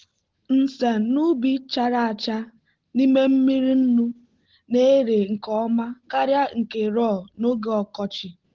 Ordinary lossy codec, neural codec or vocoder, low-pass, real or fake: Opus, 16 kbps; none; 7.2 kHz; real